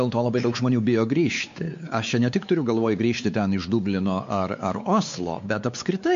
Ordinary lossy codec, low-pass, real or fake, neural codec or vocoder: MP3, 48 kbps; 7.2 kHz; fake; codec, 16 kHz, 4 kbps, X-Codec, WavLM features, trained on Multilingual LibriSpeech